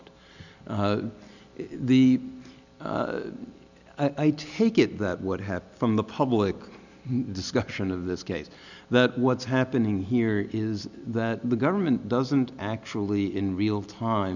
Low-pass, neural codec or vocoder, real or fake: 7.2 kHz; none; real